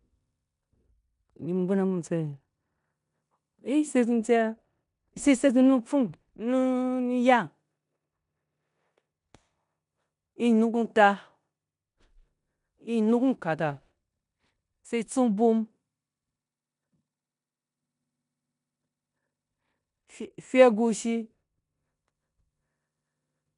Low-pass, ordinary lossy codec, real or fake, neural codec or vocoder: 10.8 kHz; none; fake; codec, 16 kHz in and 24 kHz out, 0.9 kbps, LongCat-Audio-Codec, four codebook decoder